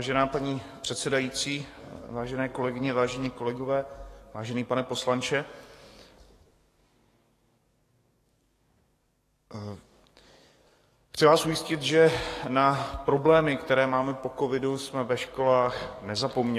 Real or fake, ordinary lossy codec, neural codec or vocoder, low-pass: fake; AAC, 48 kbps; codec, 44.1 kHz, 7.8 kbps, DAC; 14.4 kHz